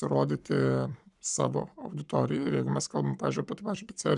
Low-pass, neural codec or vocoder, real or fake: 10.8 kHz; codec, 44.1 kHz, 7.8 kbps, Pupu-Codec; fake